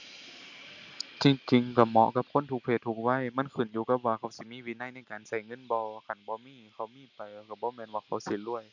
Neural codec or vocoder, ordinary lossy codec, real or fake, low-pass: none; none; real; 7.2 kHz